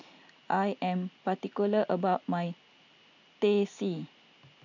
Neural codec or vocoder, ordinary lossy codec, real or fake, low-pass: none; none; real; 7.2 kHz